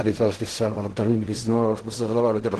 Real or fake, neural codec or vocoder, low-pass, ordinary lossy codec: fake; codec, 16 kHz in and 24 kHz out, 0.4 kbps, LongCat-Audio-Codec, fine tuned four codebook decoder; 10.8 kHz; Opus, 16 kbps